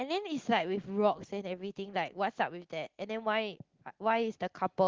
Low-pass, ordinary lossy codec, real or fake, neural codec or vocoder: 7.2 kHz; Opus, 16 kbps; real; none